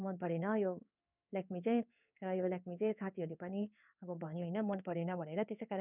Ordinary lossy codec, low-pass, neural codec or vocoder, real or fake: none; 3.6 kHz; codec, 16 kHz in and 24 kHz out, 1 kbps, XY-Tokenizer; fake